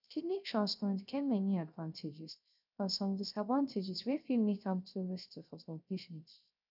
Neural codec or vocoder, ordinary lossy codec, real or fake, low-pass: codec, 16 kHz, 0.3 kbps, FocalCodec; none; fake; 5.4 kHz